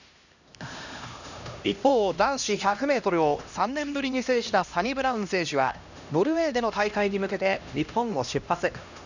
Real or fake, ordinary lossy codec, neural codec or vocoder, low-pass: fake; none; codec, 16 kHz, 1 kbps, X-Codec, HuBERT features, trained on LibriSpeech; 7.2 kHz